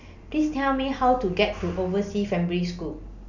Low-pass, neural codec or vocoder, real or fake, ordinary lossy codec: 7.2 kHz; none; real; none